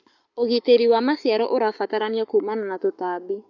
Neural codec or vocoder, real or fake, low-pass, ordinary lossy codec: codec, 44.1 kHz, 7.8 kbps, Pupu-Codec; fake; 7.2 kHz; none